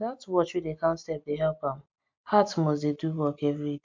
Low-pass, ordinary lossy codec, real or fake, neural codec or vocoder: 7.2 kHz; MP3, 64 kbps; real; none